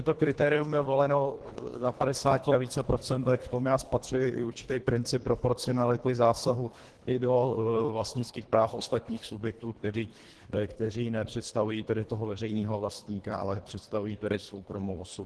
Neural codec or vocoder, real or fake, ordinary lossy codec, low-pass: codec, 24 kHz, 1.5 kbps, HILCodec; fake; Opus, 16 kbps; 10.8 kHz